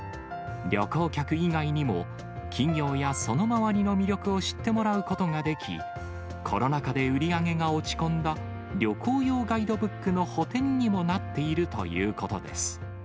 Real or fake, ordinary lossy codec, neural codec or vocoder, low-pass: real; none; none; none